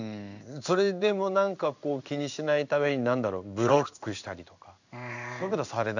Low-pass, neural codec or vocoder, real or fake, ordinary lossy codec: 7.2 kHz; codec, 16 kHz in and 24 kHz out, 1 kbps, XY-Tokenizer; fake; none